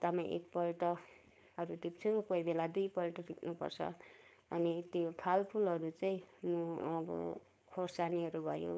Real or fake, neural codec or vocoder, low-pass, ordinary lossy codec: fake; codec, 16 kHz, 4.8 kbps, FACodec; none; none